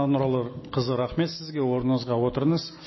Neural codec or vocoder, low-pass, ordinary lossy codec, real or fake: none; 7.2 kHz; MP3, 24 kbps; real